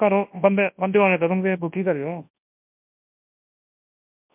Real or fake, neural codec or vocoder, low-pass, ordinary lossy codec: fake; codec, 24 kHz, 0.9 kbps, WavTokenizer, large speech release; 3.6 kHz; MP3, 32 kbps